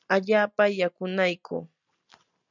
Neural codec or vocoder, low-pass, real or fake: none; 7.2 kHz; real